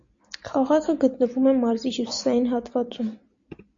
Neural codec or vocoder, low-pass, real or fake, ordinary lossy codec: none; 7.2 kHz; real; MP3, 48 kbps